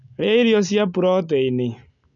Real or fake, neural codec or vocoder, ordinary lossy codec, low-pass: real; none; none; 7.2 kHz